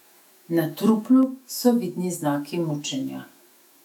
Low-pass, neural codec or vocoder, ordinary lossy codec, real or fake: 19.8 kHz; autoencoder, 48 kHz, 128 numbers a frame, DAC-VAE, trained on Japanese speech; none; fake